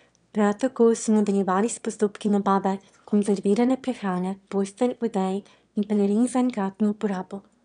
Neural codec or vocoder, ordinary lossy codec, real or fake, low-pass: autoencoder, 22.05 kHz, a latent of 192 numbers a frame, VITS, trained on one speaker; none; fake; 9.9 kHz